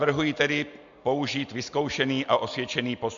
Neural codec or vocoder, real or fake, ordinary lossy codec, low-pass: none; real; MP3, 64 kbps; 7.2 kHz